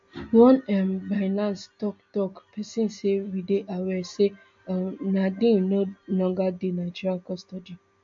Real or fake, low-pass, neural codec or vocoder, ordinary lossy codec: real; 7.2 kHz; none; MP3, 48 kbps